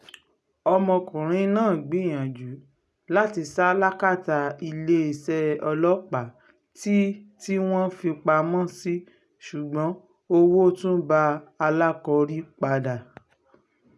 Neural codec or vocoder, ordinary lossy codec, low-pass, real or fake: none; none; none; real